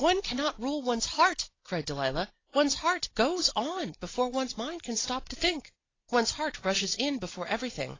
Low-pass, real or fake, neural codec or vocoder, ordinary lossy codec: 7.2 kHz; real; none; AAC, 32 kbps